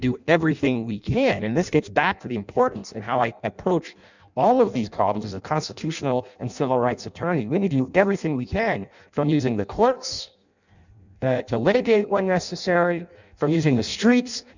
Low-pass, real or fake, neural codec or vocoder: 7.2 kHz; fake; codec, 16 kHz in and 24 kHz out, 0.6 kbps, FireRedTTS-2 codec